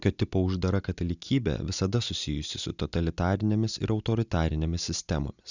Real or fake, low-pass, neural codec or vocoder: real; 7.2 kHz; none